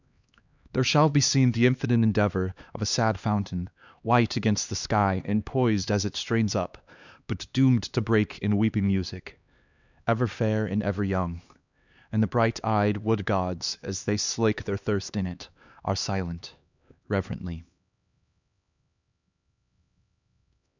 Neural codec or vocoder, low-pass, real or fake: codec, 16 kHz, 2 kbps, X-Codec, HuBERT features, trained on LibriSpeech; 7.2 kHz; fake